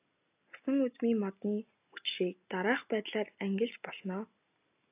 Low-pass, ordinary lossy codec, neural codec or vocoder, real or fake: 3.6 kHz; AAC, 32 kbps; none; real